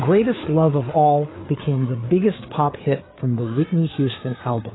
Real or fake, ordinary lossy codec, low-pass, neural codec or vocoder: fake; AAC, 16 kbps; 7.2 kHz; codec, 16 kHz, 4 kbps, FreqCodec, larger model